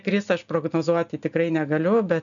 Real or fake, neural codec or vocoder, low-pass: real; none; 7.2 kHz